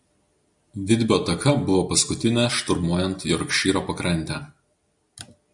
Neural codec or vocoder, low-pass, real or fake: none; 10.8 kHz; real